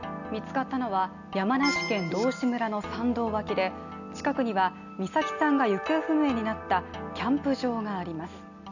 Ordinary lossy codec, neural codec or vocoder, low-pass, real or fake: none; none; 7.2 kHz; real